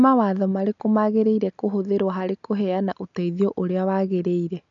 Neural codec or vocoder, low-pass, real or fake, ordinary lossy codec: none; 7.2 kHz; real; none